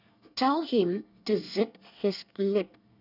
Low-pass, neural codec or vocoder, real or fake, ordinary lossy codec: 5.4 kHz; codec, 24 kHz, 1 kbps, SNAC; fake; none